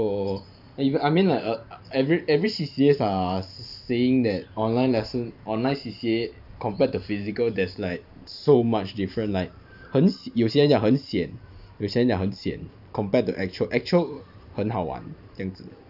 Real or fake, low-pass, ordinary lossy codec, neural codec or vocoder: fake; 5.4 kHz; none; vocoder, 44.1 kHz, 128 mel bands every 512 samples, BigVGAN v2